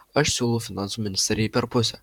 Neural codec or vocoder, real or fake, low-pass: vocoder, 44.1 kHz, 128 mel bands, Pupu-Vocoder; fake; 19.8 kHz